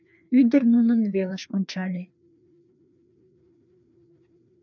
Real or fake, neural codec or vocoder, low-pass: fake; codec, 16 kHz, 2 kbps, FreqCodec, larger model; 7.2 kHz